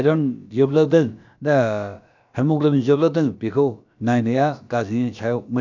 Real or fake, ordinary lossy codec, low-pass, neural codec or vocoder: fake; none; 7.2 kHz; codec, 16 kHz, about 1 kbps, DyCAST, with the encoder's durations